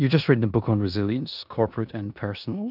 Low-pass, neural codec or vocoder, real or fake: 5.4 kHz; codec, 16 kHz in and 24 kHz out, 0.9 kbps, LongCat-Audio-Codec, four codebook decoder; fake